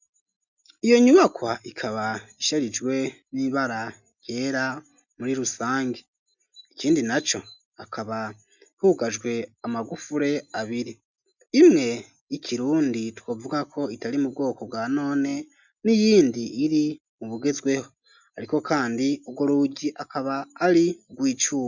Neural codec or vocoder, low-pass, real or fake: none; 7.2 kHz; real